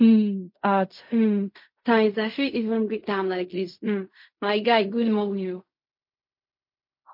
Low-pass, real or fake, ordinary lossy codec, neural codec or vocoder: 5.4 kHz; fake; MP3, 32 kbps; codec, 16 kHz in and 24 kHz out, 0.4 kbps, LongCat-Audio-Codec, fine tuned four codebook decoder